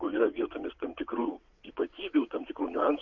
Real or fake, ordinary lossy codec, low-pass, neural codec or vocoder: fake; MP3, 48 kbps; 7.2 kHz; vocoder, 22.05 kHz, 80 mel bands, WaveNeXt